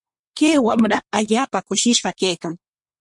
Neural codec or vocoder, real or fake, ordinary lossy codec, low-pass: codec, 24 kHz, 1 kbps, SNAC; fake; MP3, 48 kbps; 10.8 kHz